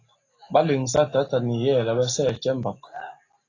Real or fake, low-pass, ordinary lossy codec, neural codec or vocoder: real; 7.2 kHz; AAC, 32 kbps; none